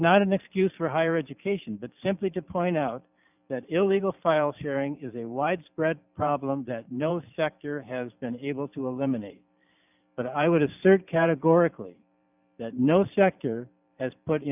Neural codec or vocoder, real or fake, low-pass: vocoder, 44.1 kHz, 128 mel bands every 512 samples, BigVGAN v2; fake; 3.6 kHz